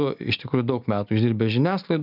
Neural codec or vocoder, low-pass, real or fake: none; 5.4 kHz; real